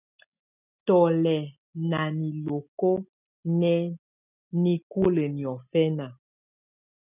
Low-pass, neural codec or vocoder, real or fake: 3.6 kHz; none; real